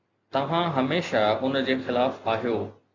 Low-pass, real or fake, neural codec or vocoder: 7.2 kHz; real; none